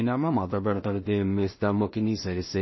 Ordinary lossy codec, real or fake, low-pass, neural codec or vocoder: MP3, 24 kbps; fake; 7.2 kHz; codec, 16 kHz in and 24 kHz out, 0.4 kbps, LongCat-Audio-Codec, two codebook decoder